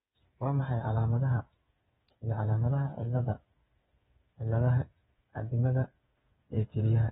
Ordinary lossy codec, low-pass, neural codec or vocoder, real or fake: AAC, 16 kbps; 7.2 kHz; codec, 16 kHz, 8 kbps, FreqCodec, smaller model; fake